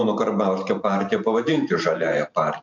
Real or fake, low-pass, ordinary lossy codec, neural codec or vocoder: fake; 7.2 kHz; MP3, 64 kbps; vocoder, 44.1 kHz, 128 mel bands every 512 samples, BigVGAN v2